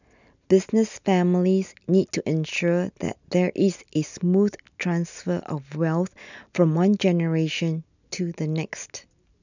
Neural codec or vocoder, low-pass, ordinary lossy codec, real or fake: none; 7.2 kHz; none; real